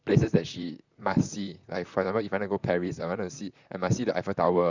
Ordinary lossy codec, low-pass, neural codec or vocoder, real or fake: none; 7.2 kHz; vocoder, 44.1 kHz, 128 mel bands, Pupu-Vocoder; fake